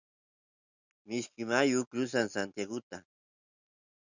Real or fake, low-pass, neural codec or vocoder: real; 7.2 kHz; none